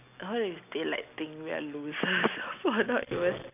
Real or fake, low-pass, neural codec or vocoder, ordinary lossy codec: real; 3.6 kHz; none; none